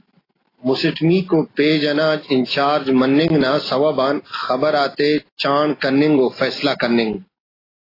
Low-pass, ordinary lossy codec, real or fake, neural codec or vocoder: 5.4 kHz; AAC, 24 kbps; real; none